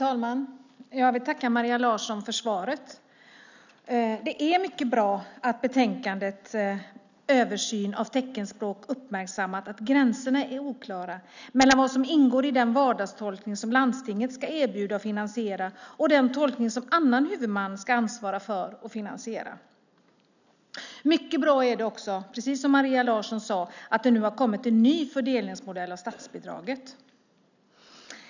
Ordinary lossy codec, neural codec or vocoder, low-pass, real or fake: none; none; 7.2 kHz; real